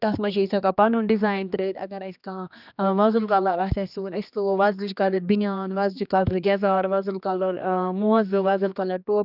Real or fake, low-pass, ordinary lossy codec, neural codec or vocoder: fake; 5.4 kHz; none; codec, 16 kHz, 2 kbps, X-Codec, HuBERT features, trained on general audio